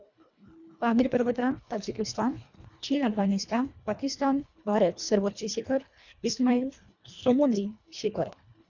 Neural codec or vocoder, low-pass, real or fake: codec, 24 kHz, 1.5 kbps, HILCodec; 7.2 kHz; fake